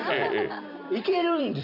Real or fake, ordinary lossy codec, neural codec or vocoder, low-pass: fake; none; vocoder, 44.1 kHz, 128 mel bands every 256 samples, BigVGAN v2; 5.4 kHz